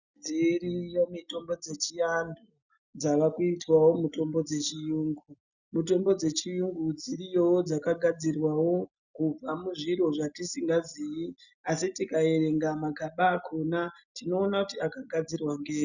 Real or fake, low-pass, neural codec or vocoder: real; 7.2 kHz; none